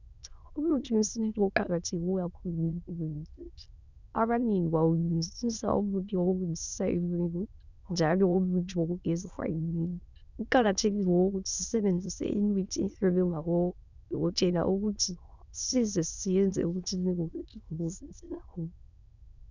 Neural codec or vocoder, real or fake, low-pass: autoencoder, 22.05 kHz, a latent of 192 numbers a frame, VITS, trained on many speakers; fake; 7.2 kHz